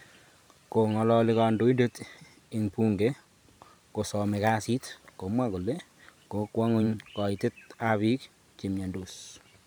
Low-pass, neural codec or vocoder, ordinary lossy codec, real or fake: none; vocoder, 44.1 kHz, 128 mel bands every 512 samples, BigVGAN v2; none; fake